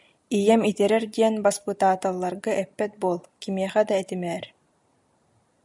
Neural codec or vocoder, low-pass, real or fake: none; 10.8 kHz; real